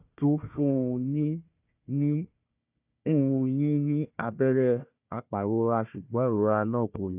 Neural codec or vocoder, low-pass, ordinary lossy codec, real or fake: codec, 16 kHz, 1 kbps, FunCodec, trained on Chinese and English, 50 frames a second; 3.6 kHz; none; fake